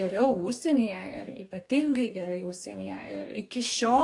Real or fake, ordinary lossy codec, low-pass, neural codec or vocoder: fake; AAC, 64 kbps; 10.8 kHz; codec, 44.1 kHz, 2.6 kbps, DAC